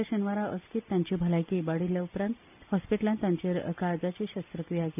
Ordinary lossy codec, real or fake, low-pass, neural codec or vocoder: none; real; 3.6 kHz; none